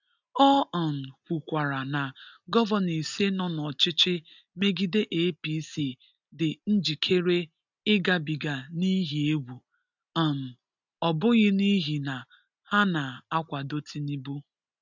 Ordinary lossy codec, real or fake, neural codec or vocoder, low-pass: none; real; none; 7.2 kHz